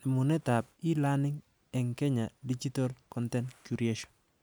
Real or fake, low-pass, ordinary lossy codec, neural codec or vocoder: real; none; none; none